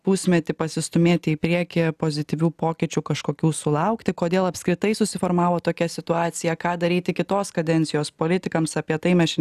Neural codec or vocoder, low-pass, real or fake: none; 14.4 kHz; real